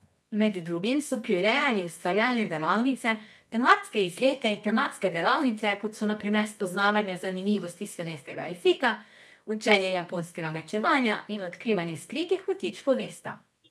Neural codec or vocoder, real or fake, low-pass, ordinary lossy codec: codec, 24 kHz, 0.9 kbps, WavTokenizer, medium music audio release; fake; none; none